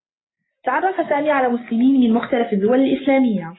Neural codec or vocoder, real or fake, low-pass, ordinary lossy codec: none; real; 7.2 kHz; AAC, 16 kbps